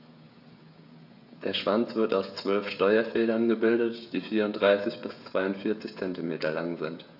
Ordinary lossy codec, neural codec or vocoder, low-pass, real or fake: AAC, 48 kbps; codec, 16 kHz, 16 kbps, FreqCodec, smaller model; 5.4 kHz; fake